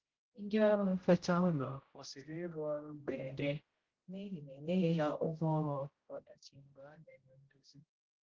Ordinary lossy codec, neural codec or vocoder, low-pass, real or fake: Opus, 16 kbps; codec, 16 kHz, 0.5 kbps, X-Codec, HuBERT features, trained on general audio; 7.2 kHz; fake